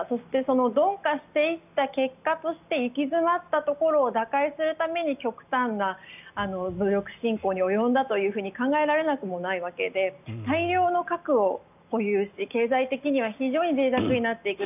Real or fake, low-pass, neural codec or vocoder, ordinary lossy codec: real; 3.6 kHz; none; none